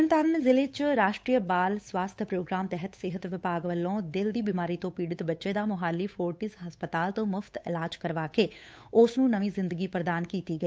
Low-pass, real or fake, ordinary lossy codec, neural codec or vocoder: none; fake; none; codec, 16 kHz, 8 kbps, FunCodec, trained on Chinese and English, 25 frames a second